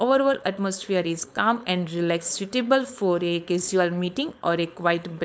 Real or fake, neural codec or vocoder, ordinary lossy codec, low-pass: fake; codec, 16 kHz, 4.8 kbps, FACodec; none; none